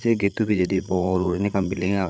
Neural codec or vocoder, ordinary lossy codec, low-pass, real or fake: codec, 16 kHz, 8 kbps, FreqCodec, larger model; none; none; fake